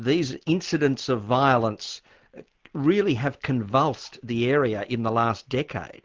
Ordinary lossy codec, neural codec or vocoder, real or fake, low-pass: Opus, 16 kbps; none; real; 7.2 kHz